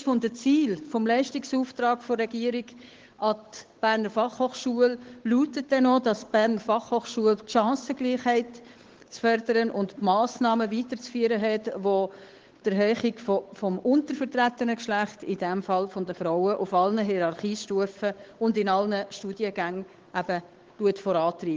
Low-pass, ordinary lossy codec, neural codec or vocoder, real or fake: 7.2 kHz; Opus, 16 kbps; codec, 16 kHz, 8 kbps, FunCodec, trained on Chinese and English, 25 frames a second; fake